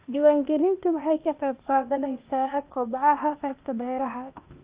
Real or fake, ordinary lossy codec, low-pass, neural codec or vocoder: fake; Opus, 32 kbps; 3.6 kHz; codec, 16 kHz, 0.8 kbps, ZipCodec